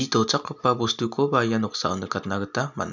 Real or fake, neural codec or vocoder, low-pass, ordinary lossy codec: real; none; 7.2 kHz; none